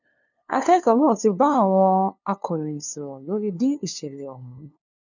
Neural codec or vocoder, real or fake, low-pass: codec, 16 kHz, 2 kbps, FunCodec, trained on LibriTTS, 25 frames a second; fake; 7.2 kHz